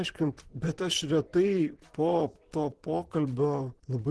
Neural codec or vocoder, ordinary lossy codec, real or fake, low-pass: vocoder, 44.1 kHz, 128 mel bands, Pupu-Vocoder; Opus, 16 kbps; fake; 10.8 kHz